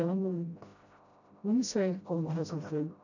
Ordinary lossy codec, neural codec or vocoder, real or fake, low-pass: none; codec, 16 kHz, 0.5 kbps, FreqCodec, smaller model; fake; 7.2 kHz